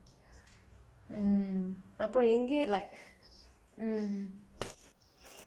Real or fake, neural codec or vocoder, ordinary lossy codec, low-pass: fake; codec, 44.1 kHz, 2.6 kbps, DAC; Opus, 32 kbps; 19.8 kHz